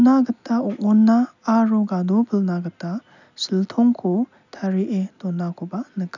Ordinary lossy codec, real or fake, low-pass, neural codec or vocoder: none; real; 7.2 kHz; none